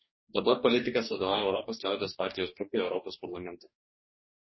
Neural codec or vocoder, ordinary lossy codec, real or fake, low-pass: codec, 44.1 kHz, 2.6 kbps, DAC; MP3, 24 kbps; fake; 7.2 kHz